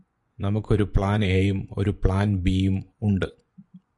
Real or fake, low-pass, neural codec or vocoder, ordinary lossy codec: fake; 10.8 kHz; vocoder, 24 kHz, 100 mel bands, Vocos; Opus, 64 kbps